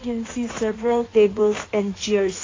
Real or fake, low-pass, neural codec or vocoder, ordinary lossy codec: fake; 7.2 kHz; codec, 16 kHz in and 24 kHz out, 1.1 kbps, FireRedTTS-2 codec; AAC, 32 kbps